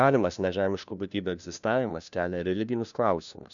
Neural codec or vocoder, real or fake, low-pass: codec, 16 kHz, 1 kbps, FunCodec, trained on LibriTTS, 50 frames a second; fake; 7.2 kHz